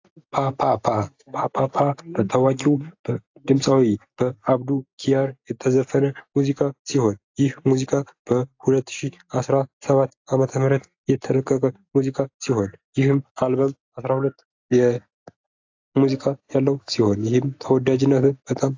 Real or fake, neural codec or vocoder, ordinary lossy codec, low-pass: real; none; AAC, 48 kbps; 7.2 kHz